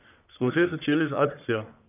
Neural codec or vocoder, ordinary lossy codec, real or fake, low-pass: codec, 44.1 kHz, 1.7 kbps, Pupu-Codec; none; fake; 3.6 kHz